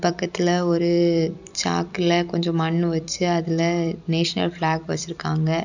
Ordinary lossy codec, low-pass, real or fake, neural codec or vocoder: none; 7.2 kHz; fake; vocoder, 44.1 kHz, 128 mel bands every 256 samples, BigVGAN v2